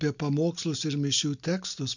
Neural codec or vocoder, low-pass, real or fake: none; 7.2 kHz; real